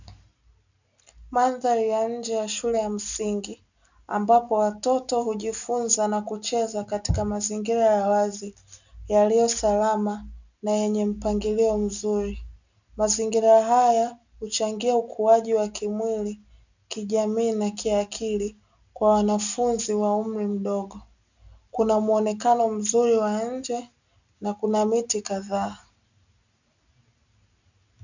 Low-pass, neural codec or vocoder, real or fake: 7.2 kHz; none; real